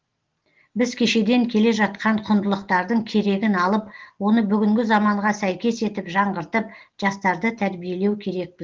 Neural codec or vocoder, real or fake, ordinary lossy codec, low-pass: none; real; Opus, 16 kbps; 7.2 kHz